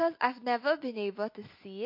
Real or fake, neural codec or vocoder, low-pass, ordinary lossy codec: real; none; 5.4 kHz; MP3, 32 kbps